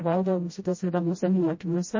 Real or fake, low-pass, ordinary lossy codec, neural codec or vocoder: fake; 7.2 kHz; MP3, 32 kbps; codec, 16 kHz, 0.5 kbps, FreqCodec, smaller model